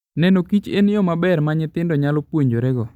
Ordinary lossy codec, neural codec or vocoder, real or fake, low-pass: none; none; real; 19.8 kHz